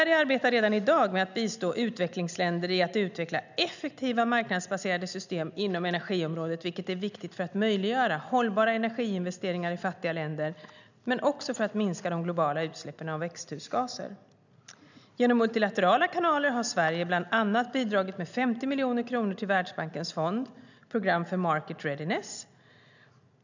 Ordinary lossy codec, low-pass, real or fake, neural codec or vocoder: none; 7.2 kHz; real; none